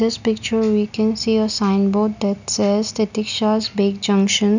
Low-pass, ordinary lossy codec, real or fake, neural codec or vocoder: 7.2 kHz; none; real; none